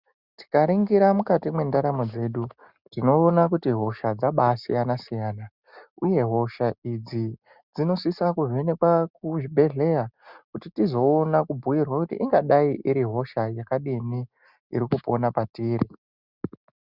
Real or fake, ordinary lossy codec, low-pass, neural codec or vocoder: real; Opus, 64 kbps; 5.4 kHz; none